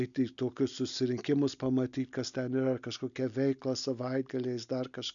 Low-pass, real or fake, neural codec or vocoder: 7.2 kHz; real; none